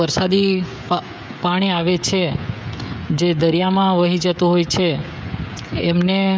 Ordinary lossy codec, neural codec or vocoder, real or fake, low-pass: none; codec, 16 kHz, 16 kbps, FreqCodec, smaller model; fake; none